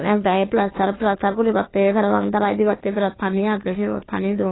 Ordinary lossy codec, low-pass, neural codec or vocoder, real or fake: AAC, 16 kbps; 7.2 kHz; codec, 16 kHz, 2 kbps, FunCodec, trained on Chinese and English, 25 frames a second; fake